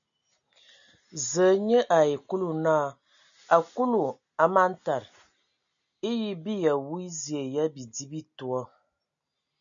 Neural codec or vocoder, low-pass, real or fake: none; 7.2 kHz; real